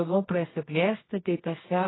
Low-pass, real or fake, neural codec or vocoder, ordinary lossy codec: 7.2 kHz; fake; codec, 24 kHz, 0.9 kbps, WavTokenizer, medium music audio release; AAC, 16 kbps